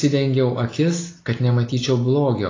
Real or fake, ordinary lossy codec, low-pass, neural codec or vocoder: real; AAC, 32 kbps; 7.2 kHz; none